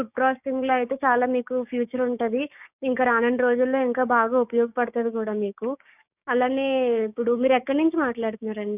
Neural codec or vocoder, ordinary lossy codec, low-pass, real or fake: codec, 16 kHz, 6 kbps, DAC; none; 3.6 kHz; fake